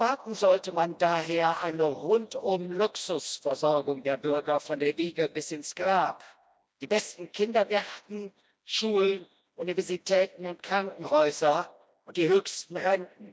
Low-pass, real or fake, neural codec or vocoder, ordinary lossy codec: none; fake; codec, 16 kHz, 1 kbps, FreqCodec, smaller model; none